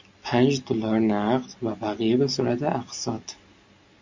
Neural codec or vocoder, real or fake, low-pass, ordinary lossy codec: none; real; 7.2 kHz; MP3, 48 kbps